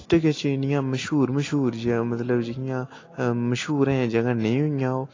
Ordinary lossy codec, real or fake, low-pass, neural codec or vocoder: AAC, 32 kbps; real; 7.2 kHz; none